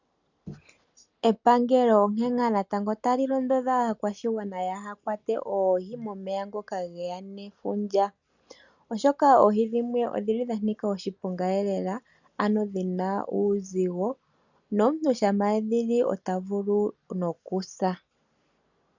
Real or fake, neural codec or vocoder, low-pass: real; none; 7.2 kHz